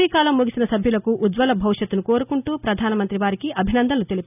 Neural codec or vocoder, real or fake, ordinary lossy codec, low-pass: none; real; none; 3.6 kHz